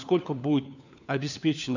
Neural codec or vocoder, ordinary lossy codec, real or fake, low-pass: codec, 16 kHz, 4 kbps, FunCodec, trained on LibriTTS, 50 frames a second; none; fake; 7.2 kHz